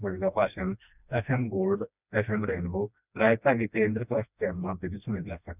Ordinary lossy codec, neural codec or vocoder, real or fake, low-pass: none; codec, 16 kHz, 1 kbps, FreqCodec, smaller model; fake; 3.6 kHz